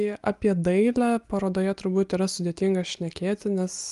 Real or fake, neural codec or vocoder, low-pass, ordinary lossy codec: real; none; 10.8 kHz; Opus, 32 kbps